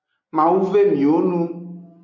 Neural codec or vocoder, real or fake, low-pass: none; real; 7.2 kHz